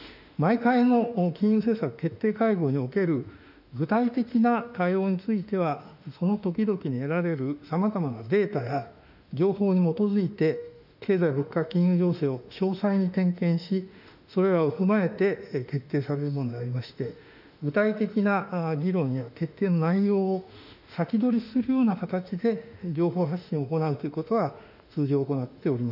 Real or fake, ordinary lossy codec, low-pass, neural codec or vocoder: fake; none; 5.4 kHz; autoencoder, 48 kHz, 32 numbers a frame, DAC-VAE, trained on Japanese speech